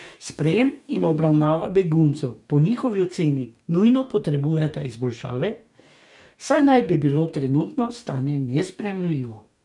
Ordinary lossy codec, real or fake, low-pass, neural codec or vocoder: none; fake; 10.8 kHz; codec, 44.1 kHz, 2.6 kbps, DAC